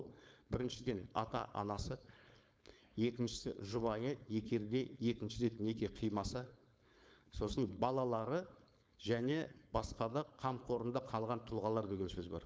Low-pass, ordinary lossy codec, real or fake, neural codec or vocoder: none; none; fake; codec, 16 kHz, 4.8 kbps, FACodec